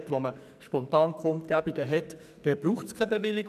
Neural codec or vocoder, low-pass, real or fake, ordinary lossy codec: codec, 44.1 kHz, 2.6 kbps, SNAC; 14.4 kHz; fake; none